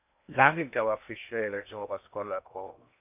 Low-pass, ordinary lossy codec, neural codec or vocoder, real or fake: 3.6 kHz; none; codec, 16 kHz in and 24 kHz out, 0.6 kbps, FocalCodec, streaming, 2048 codes; fake